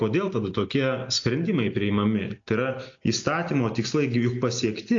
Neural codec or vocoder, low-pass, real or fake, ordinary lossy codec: none; 7.2 kHz; real; AAC, 48 kbps